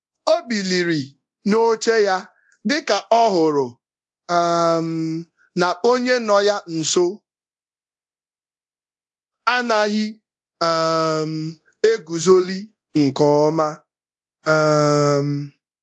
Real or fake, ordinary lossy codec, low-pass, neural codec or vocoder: fake; AAC, 64 kbps; 10.8 kHz; codec, 24 kHz, 0.9 kbps, DualCodec